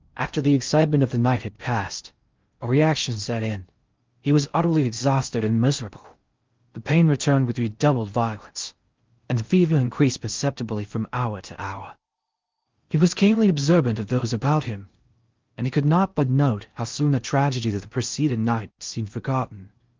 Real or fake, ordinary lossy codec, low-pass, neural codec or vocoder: fake; Opus, 24 kbps; 7.2 kHz; codec, 16 kHz in and 24 kHz out, 0.6 kbps, FocalCodec, streaming, 4096 codes